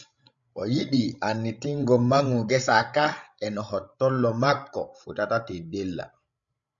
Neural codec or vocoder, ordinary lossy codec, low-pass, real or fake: codec, 16 kHz, 16 kbps, FreqCodec, larger model; MP3, 96 kbps; 7.2 kHz; fake